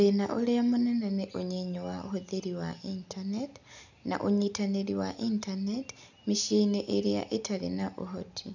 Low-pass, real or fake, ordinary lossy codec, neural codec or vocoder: 7.2 kHz; real; none; none